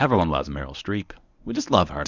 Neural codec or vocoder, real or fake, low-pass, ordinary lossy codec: codec, 24 kHz, 0.9 kbps, WavTokenizer, medium speech release version 1; fake; 7.2 kHz; Opus, 64 kbps